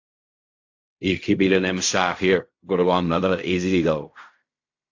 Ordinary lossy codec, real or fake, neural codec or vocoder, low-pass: AAC, 48 kbps; fake; codec, 16 kHz in and 24 kHz out, 0.4 kbps, LongCat-Audio-Codec, fine tuned four codebook decoder; 7.2 kHz